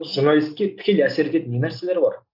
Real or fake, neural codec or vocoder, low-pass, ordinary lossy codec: real; none; 5.4 kHz; none